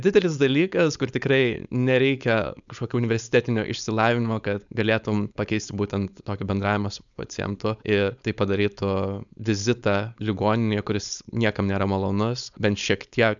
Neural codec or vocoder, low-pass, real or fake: codec, 16 kHz, 4.8 kbps, FACodec; 7.2 kHz; fake